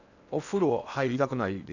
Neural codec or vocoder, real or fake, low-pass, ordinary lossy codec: codec, 16 kHz in and 24 kHz out, 0.8 kbps, FocalCodec, streaming, 65536 codes; fake; 7.2 kHz; none